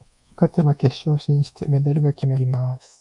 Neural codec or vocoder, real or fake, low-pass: codec, 24 kHz, 1.2 kbps, DualCodec; fake; 10.8 kHz